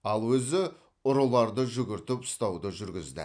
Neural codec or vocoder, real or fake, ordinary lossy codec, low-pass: none; real; none; 9.9 kHz